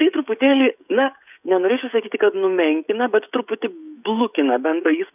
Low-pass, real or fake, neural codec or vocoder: 3.6 kHz; fake; codec, 16 kHz, 16 kbps, FreqCodec, smaller model